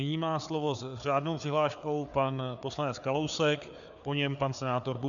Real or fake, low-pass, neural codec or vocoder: fake; 7.2 kHz; codec, 16 kHz, 4 kbps, FreqCodec, larger model